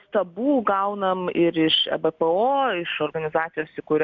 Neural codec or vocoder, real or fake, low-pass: none; real; 7.2 kHz